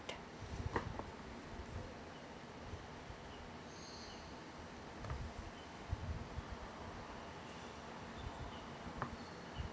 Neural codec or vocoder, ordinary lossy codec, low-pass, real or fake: none; none; none; real